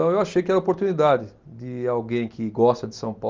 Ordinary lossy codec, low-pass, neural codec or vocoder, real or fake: Opus, 24 kbps; 7.2 kHz; none; real